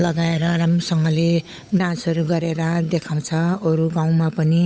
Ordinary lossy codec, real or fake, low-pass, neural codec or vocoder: none; fake; none; codec, 16 kHz, 8 kbps, FunCodec, trained on Chinese and English, 25 frames a second